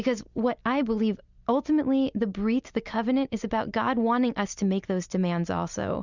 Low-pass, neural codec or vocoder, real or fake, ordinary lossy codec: 7.2 kHz; none; real; Opus, 64 kbps